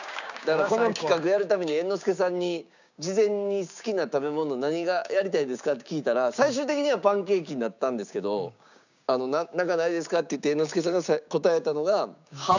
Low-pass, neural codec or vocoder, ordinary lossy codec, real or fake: 7.2 kHz; codec, 16 kHz, 6 kbps, DAC; none; fake